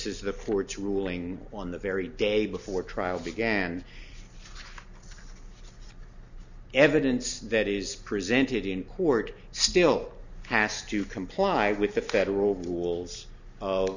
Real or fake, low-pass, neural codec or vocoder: real; 7.2 kHz; none